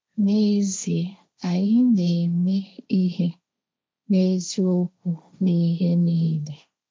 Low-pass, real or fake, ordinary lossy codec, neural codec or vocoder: none; fake; none; codec, 16 kHz, 1.1 kbps, Voila-Tokenizer